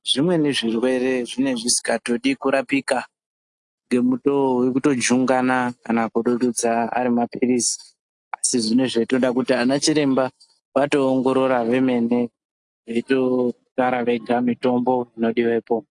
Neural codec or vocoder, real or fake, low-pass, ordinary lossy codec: none; real; 10.8 kHz; AAC, 64 kbps